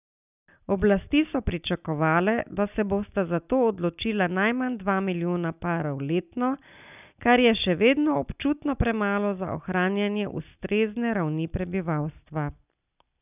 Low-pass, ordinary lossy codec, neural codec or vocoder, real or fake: 3.6 kHz; none; none; real